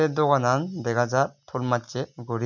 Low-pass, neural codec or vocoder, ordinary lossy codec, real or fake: 7.2 kHz; none; none; real